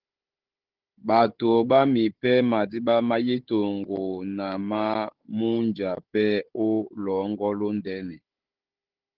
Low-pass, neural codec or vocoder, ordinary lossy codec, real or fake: 5.4 kHz; codec, 16 kHz, 16 kbps, FunCodec, trained on Chinese and English, 50 frames a second; Opus, 16 kbps; fake